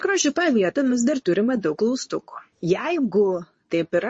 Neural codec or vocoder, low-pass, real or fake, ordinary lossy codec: codec, 24 kHz, 0.9 kbps, WavTokenizer, medium speech release version 2; 10.8 kHz; fake; MP3, 32 kbps